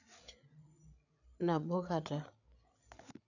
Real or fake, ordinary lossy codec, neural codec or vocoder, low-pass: fake; none; codec, 16 kHz, 8 kbps, FreqCodec, larger model; 7.2 kHz